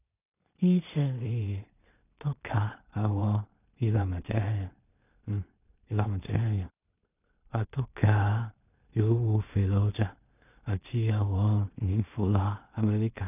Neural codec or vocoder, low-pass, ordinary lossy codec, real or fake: codec, 16 kHz in and 24 kHz out, 0.4 kbps, LongCat-Audio-Codec, two codebook decoder; 3.6 kHz; none; fake